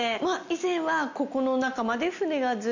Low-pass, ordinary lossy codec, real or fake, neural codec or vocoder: 7.2 kHz; none; real; none